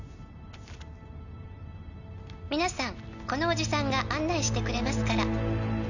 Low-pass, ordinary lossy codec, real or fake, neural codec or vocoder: 7.2 kHz; none; real; none